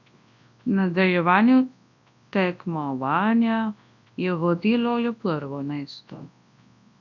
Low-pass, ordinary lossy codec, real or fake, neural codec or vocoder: 7.2 kHz; none; fake; codec, 24 kHz, 0.9 kbps, WavTokenizer, large speech release